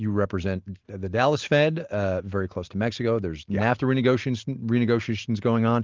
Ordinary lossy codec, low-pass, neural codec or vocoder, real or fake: Opus, 32 kbps; 7.2 kHz; none; real